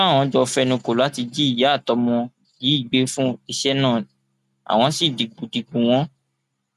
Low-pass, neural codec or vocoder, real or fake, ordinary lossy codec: 14.4 kHz; none; real; none